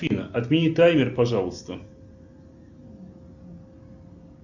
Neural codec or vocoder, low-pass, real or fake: none; 7.2 kHz; real